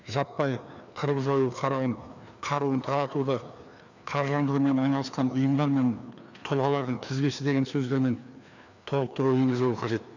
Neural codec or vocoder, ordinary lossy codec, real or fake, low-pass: codec, 16 kHz, 2 kbps, FreqCodec, larger model; none; fake; 7.2 kHz